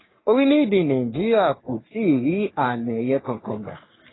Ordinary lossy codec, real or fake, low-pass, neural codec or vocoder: AAC, 16 kbps; fake; 7.2 kHz; codec, 44.1 kHz, 3.4 kbps, Pupu-Codec